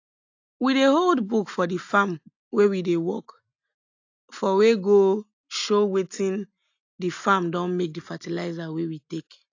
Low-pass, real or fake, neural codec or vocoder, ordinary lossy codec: 7.2 kHz; real; none; AAC, 48 kbps